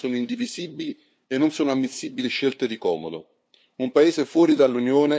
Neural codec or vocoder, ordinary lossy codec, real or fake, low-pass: codec, 16 kHz, 2 kbps, FunCodec, trained on LibriTTS, 25 frames a second; none; fake; none